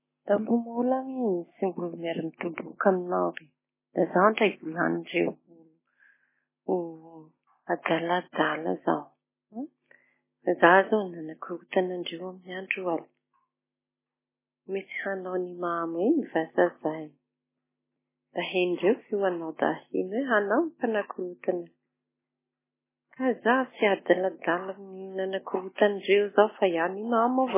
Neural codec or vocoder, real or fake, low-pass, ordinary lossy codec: none; real; 3.6 kHz; MP3, 16 kbps